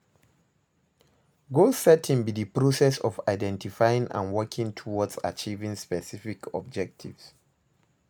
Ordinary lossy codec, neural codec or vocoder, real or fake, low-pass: none; none; real; none